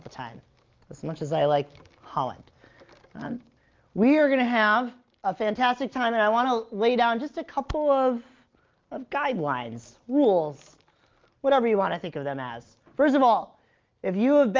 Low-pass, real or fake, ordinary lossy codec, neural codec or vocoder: 7.2 kHz; real; Opus, 32 kbps; none